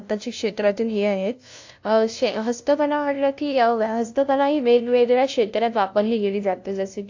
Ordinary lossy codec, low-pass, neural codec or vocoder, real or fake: AAC, 48 kbps; 7.2 kHz; codec, 16 kHz, 0.5 kbps, FunCodec, trained on LibriTTS, 25 frames a second; fake